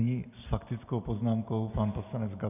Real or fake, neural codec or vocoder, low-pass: real; none; 3.6 kHz